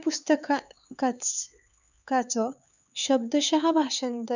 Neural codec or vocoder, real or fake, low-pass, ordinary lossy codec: codec, 16 kHz, 4 kbps, X-Codec, HuBERT features, trained on LibriSpeech; fake; 7.2 kHz; none